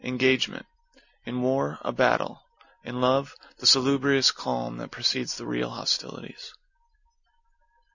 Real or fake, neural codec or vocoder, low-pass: real; none; 7.2 kHz